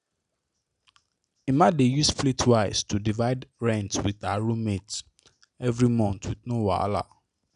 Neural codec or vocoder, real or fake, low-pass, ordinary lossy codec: none; real; 10.8 kHz; none